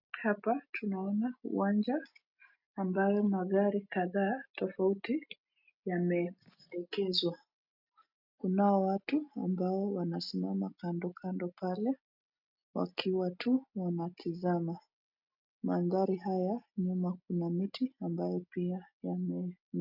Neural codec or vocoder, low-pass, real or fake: none; 5.4 kHz; real